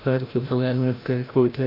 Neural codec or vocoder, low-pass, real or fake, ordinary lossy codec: codec, 16 kHz, 0.5 kbps, FreqCodec, larger model; 5.4 kHz; fake; AAC, 32 kbps